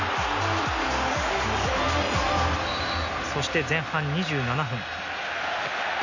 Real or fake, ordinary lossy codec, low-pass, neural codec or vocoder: real; none; 7.2 kHz; none